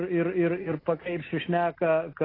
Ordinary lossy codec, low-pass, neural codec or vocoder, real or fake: AAC, 24 kbps; 5.4 kHz; none; real